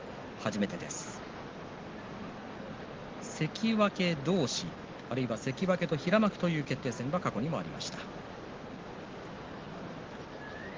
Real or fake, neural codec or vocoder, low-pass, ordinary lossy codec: real; none; 7.2 kHz; Opus, 16 kbps